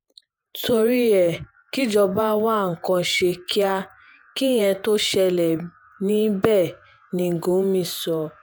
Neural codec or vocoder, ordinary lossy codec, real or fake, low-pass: vocoder, 48 kHz, 128 mel bands, Vocos; none; fake; none